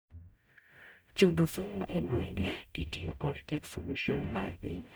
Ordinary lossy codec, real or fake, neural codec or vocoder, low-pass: none; fake; codec, 44.1 kHz, 0.9 kbps, DAC; none